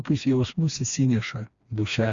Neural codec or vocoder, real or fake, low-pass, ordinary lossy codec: codec, 16 kHz, 2 kbps, FreqCodec, smaller model; fake; 7.2 kHz; Opus, 64 kbps